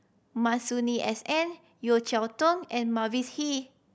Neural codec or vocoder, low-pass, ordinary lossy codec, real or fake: none; none; none; real